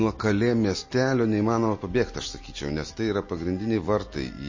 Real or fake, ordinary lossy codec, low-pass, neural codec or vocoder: real; MP3, 32 kbps; 7.2 kHz; none